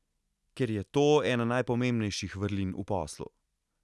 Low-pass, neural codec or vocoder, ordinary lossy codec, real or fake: none; none; none; real